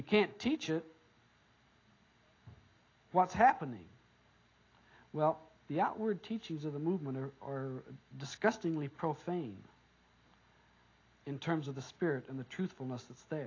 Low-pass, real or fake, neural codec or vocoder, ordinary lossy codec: 7.2 kHz; real; none; AAC, 32 kbps